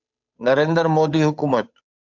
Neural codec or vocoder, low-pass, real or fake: codec, 16 kHz, 8 kbps, FunCodec, trained on Chinese and English, 25 frames a second; 7.2 kHz; fake